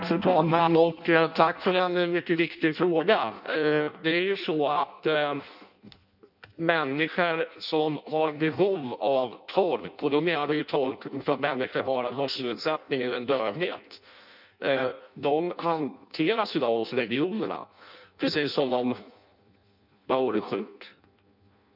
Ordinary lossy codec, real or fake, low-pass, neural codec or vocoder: none; fake; 5.4 kHz; codec, 16 kHz in and 24 kHz out, 0.6 kbps, FireRedTTS-2 codec